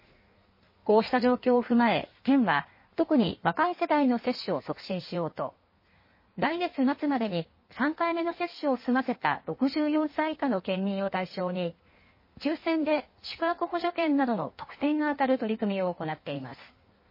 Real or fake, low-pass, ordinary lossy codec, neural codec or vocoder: fake; 5.4 kHz; MP3, 24 kbps; codec, 16 kHz in and 24 kHz out, 1.1 kbps, FireRedTTS-2 codec